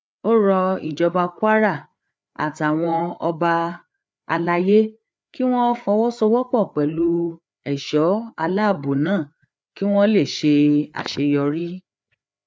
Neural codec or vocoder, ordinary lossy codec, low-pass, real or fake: codec, 16 kHz, 4 kbps, FreqCodec, larger model; none; none; fake